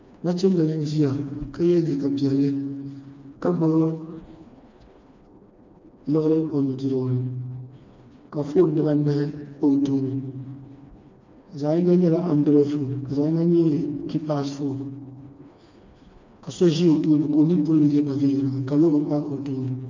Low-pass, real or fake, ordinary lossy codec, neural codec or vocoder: 7.2 kHz; fake; MP3, 64 kbps; codec, 16 kHz, 2 kbps, FreqCodec, smaller model